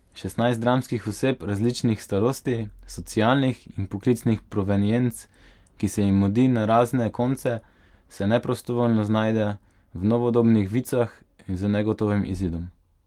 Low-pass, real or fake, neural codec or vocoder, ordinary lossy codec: 19.8 kHz; real; none; Opus, 24 kbps